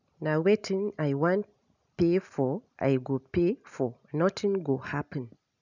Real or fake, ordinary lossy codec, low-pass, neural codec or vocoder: real; none; 7.2 kHz; none